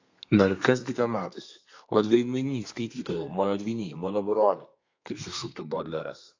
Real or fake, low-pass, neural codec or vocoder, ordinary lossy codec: fake; 7.2 kHz; codec, 32 kHz, 1.9 kbps, SNAC; AAC, 48 kbps